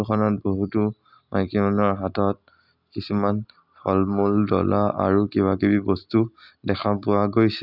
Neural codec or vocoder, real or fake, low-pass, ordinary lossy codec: none; real; 5.4 kHz; none